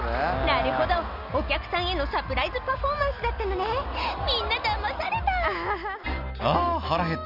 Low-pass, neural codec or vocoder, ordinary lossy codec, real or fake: 5.4 kHz; none; none; real